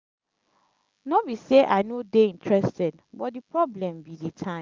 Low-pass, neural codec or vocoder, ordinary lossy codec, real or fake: 7.2 kHz; none; Opus, 24 kbps; real